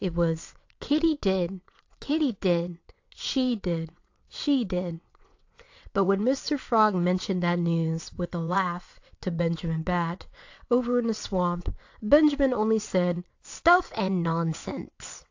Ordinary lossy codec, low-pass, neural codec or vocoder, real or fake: AAC, 48 kbps; 7.2 kHz; vocoder, 22.05 kHz, 80 mel bands, WaveNeXt; fake